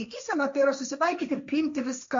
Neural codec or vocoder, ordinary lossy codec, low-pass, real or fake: codec, 16 kHz, 1.1 kbps, Voila-Tokenizer; MP3, 48 kbps; 7.2 kHz; fake